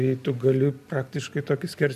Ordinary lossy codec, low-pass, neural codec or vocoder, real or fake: AAC, 96 kbps; 14.4 kHz; none; real